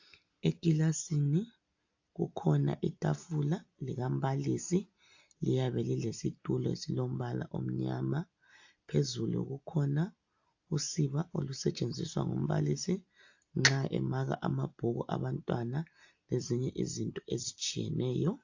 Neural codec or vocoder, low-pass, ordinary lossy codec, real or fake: none; 7.2 kHz; AAC, 48 kbps; real